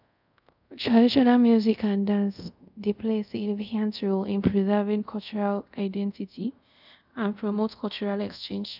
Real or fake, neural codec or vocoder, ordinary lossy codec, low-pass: fake; codec, 24 kHz, 0.5 kbps, DualCodec; none; 5.4 kHz